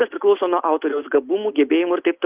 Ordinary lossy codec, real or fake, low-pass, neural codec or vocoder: Opus, 32 kbps; real; 3.6 kHz; none